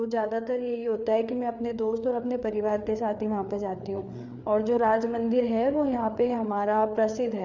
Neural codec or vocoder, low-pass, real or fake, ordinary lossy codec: codec, 16 kHz, 4 kbps, FreqCodec, larger model; 7.2 kHz; fake; none